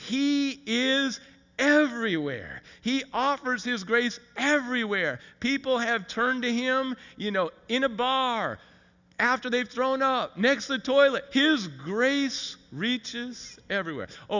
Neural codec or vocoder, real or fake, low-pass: none; real; 7.2 kHz